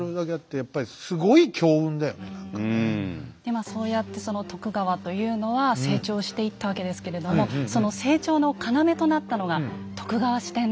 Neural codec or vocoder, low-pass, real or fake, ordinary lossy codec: none; none; real; none